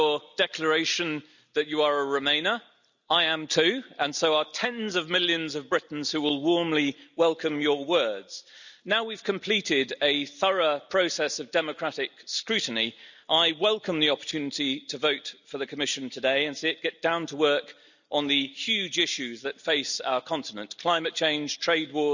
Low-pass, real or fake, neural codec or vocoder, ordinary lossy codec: 7.2 kHz; real; none; none